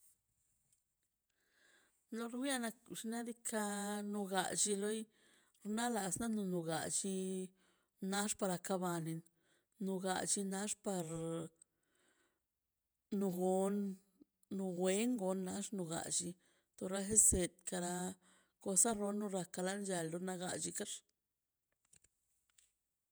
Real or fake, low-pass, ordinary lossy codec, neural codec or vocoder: fake; none; none; vocoder, 48 kHz, 128 mel bands, Vocos